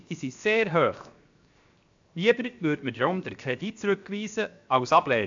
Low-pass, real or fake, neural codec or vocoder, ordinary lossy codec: 7.2 kHz; fake; codec, 16 kHz, 0.7 kbps, FocalCodec; AAC, 64 kbps